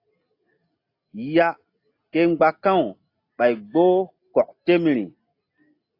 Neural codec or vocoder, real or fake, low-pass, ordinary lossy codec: none; real; 5.4 kHz; AAC, 32 kbps